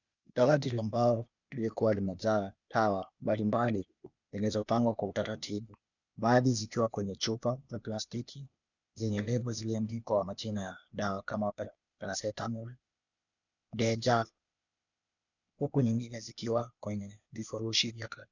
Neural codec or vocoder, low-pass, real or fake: codec, 16 kHz, 0.8 kbps, ZipCodec; 7.2 kHz; fake